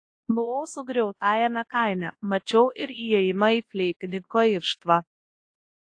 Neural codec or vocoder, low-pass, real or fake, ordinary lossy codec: codec, 24 kHz, 0.9 kbps, WavTokenizer, large speech release; 9.9 kHz; fake; AAC, 48 kbps